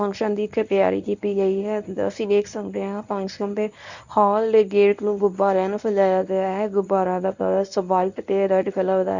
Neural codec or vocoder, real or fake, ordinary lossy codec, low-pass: codec, 24 kHz, 0.9 kbps, WavTokenizer, medium speech release version 1; fake; none; 7.2 kHz